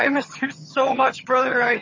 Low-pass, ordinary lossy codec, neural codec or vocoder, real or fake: 7.2 kHz; MP3, 32 kbps; vocoder, 22.05 kHz, 80 mel bands, HiFi-GAN; fake